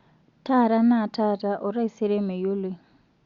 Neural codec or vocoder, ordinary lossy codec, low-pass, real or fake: none; Opus, 64 kbps; 7.2 kHz; real